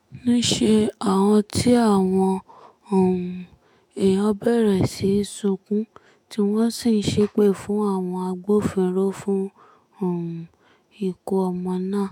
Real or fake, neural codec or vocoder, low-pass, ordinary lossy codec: fake; autoencoder, 48 kHz, 128 numbers a frame, DAC-VAE, trained on Japanese speech; 19.8 kHz; MP3, 96 kbps